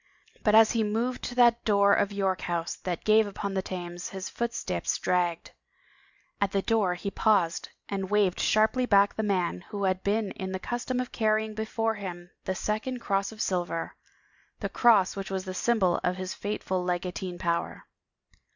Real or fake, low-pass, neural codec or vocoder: real; 7.2 kHz; none